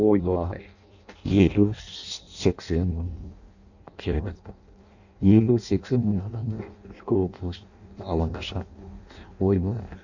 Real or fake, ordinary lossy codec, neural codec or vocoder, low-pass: fake; none; codec, 16 kHz in and 24 kHz out, 0.6 kbps, FireRedTTS-2 codec; 7.2 kHz